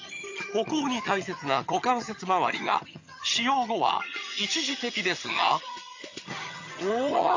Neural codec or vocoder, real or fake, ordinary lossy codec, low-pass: vocoder, 22.05 kHz, 80 mel bands, HiFi-GAN; fake; AAC, 48 kbps; 7.2 kHz